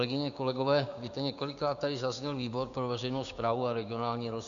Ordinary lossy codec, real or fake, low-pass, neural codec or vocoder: MP3, 96 kbps; fake; 7.2 kHz; codec, 16 kHz, 6 kbps, DAC